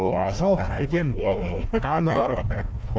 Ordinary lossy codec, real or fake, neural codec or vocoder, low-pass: none; fake; codec, 16 kHz, 1 kbps, FunCodec, trained on Chinese and English, 50 frames a second; none